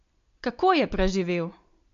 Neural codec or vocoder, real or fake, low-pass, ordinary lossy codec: none; real; 7.2 kHz; MP3, 48 kbps